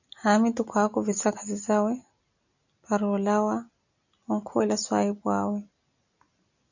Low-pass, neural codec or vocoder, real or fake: 7.2 kHz; none; real